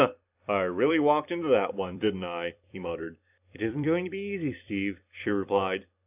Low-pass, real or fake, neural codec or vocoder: 3.6 kHz; fake; vocoder, 44.1 kHz, 128 mel bands every 256 samples, BigVGAN v2